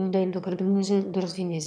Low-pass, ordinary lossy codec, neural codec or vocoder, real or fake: none; none; autoencoder, 22.05 kHz, a latent of 192 numbers a frame, VITS, trained on one speaker; fake